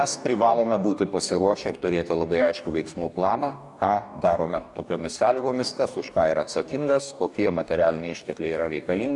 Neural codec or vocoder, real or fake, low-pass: codec, 44.1 kHz, 2.6 kbps, DAC; fake; 10.8 kHz